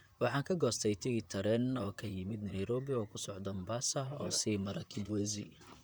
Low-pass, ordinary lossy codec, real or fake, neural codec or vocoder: none; none; fake; vocoder, 44.1 kHz, 128 mel bands, Pupu-Vocoder